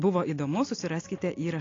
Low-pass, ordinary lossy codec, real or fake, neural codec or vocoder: 7.2 kHz; AAC, 32 kbps; real; none